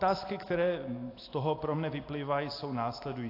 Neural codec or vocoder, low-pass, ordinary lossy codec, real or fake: none; 5.4 kHz; AAC, 32 kbps; real